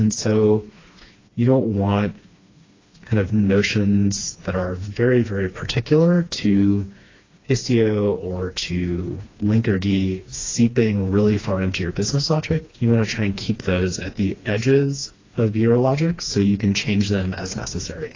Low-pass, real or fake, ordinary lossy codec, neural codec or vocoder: 7.2 kHz; fake; AAC, 32 kbps; codec, 16 kHz, 2 kbps, FreqCodec, smaller model